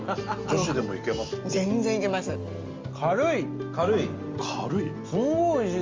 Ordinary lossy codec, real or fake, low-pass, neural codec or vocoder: Opus, 32 kbps; real; 7.2 kHz; none